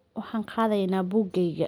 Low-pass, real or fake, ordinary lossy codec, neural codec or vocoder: 19.8 kHz; real; none; none